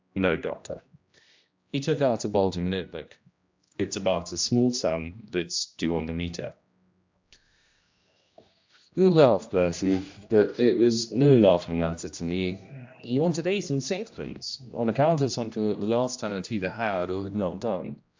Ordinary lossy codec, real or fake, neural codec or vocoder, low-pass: MP3, 64 kbps; fake; codec, 16 kHz, 1 kbps, X-Codec, HuBERT features, trained on general audio; 7.2 kHz